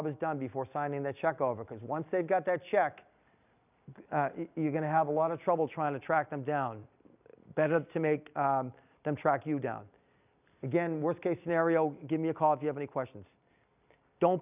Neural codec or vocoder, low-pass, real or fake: none; 3.6 kHz; real